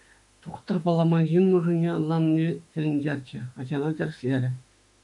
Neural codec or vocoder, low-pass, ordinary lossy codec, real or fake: autoencoder, 48 kHz, 32 numbers a frame, DAC-VAE, trained on Japanese speech; 10.8 kHz; MP3, 64 kbps; fake